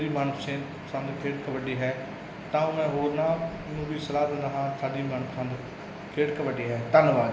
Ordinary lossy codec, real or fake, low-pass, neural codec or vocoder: none; real; none; none